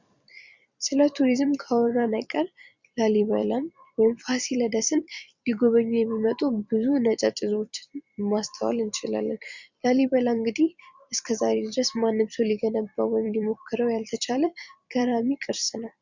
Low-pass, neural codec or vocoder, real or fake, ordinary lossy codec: 7.2 kHz; vocoder, 44.1 kHz, 128 mel bands every 256 samples, BigVGAN v2; fake; Opus, 64 kbps